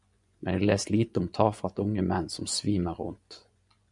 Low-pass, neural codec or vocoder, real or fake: 10.8 kHz; vocoder, 24 kHz, 100 mel bands, Vocos; fake